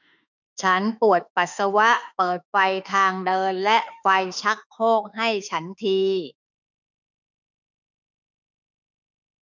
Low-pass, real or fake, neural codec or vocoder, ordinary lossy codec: 7.2 kHz; fake; autoencoder, 48 kHz, 32 numbers a frame, DAC-VAE, trained on Japanese speech; none